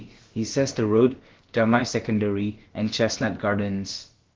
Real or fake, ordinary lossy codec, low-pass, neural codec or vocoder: fake; Opus, 16 kbps; 7.2 kHz; codec, 16 kHz, about 1 kbps, DyCAST, with the encoder's durations